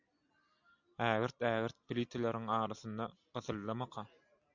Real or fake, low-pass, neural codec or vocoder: real; 7.2 kHz; none